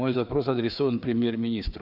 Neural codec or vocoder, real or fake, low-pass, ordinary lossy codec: codec, 16 kHz, 4 kbps, FreqCodec, larger model; fake; 5.4 kHz; Opus, 64 kbps